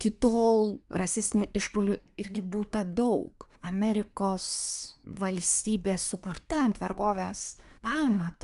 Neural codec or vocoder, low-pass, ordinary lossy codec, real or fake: codec, 24 kHz, 1 kbps, SNAC; 10.8 kHz; AAC, 96 kbps; fake